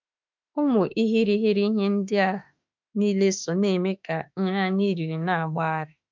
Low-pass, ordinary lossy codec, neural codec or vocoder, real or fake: 7.2 kHz; MP3, 64 kbps; autoencoder, 48 kHz, 32 numbers a frame, DAC-VAE, trained on Japanese speech; fake